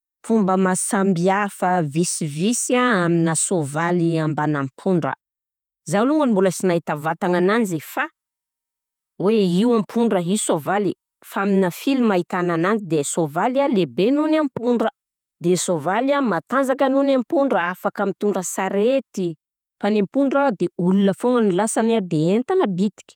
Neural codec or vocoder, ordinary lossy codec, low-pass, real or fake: vocoder, 48 kHz, 128 mel bands, Vocos; none; 19.8 kHz; fake